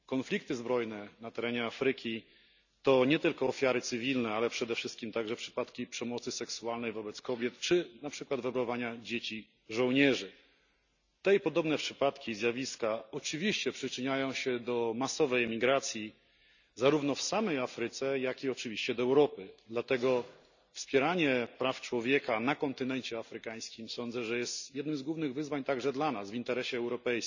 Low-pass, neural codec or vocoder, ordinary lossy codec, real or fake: 7.2 kHz; none; none; real